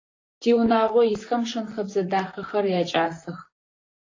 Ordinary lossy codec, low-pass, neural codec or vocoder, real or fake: AAC, 32 kbps; 7.2 kHz; vocoder, 44.1 kHz, 128 mel bands, Pupu-Vocoder; fake